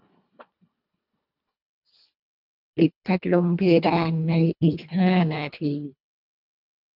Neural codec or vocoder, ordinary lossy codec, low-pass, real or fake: codec, 24 kHz, 1.5 kbps, HILCodec; none; 5.4 kHz; fake